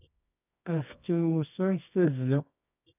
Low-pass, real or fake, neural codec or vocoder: 3.6 kHz; fake; codec, 24 kHz, 0.9 kbps, WavTokenizer, medium music audio release